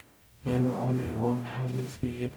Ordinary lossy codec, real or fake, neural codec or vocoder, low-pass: none; fake; codec, 44.1 kHz, 0.9 kbps, DAC; none